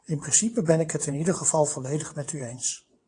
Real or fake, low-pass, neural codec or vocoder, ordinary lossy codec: fake; 9.9 kHz; vocoder, 22.05 kHz, 80 mel bands, WaveNeXt; AAC, 48 kbps